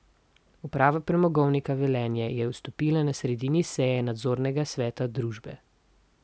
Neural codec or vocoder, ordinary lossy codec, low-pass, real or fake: none; none; none; real